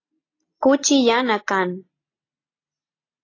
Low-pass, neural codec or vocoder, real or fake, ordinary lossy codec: 7.2 kHz; none; real; AAC, 32 kbps